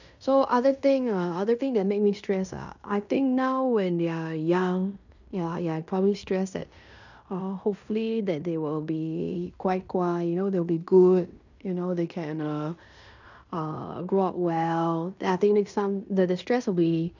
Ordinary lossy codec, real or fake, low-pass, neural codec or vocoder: none; fake; 7.2 kHz; codec, 16 kHz in and 24 kHz out, 0.9 kbps, LongCat-Audio-Codec, fine tuned four codebook decoder